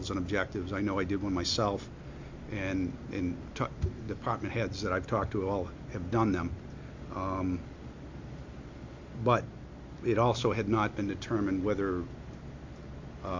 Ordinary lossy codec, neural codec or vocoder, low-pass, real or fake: MP3, 48 kbps; none; 7.2 kHz; real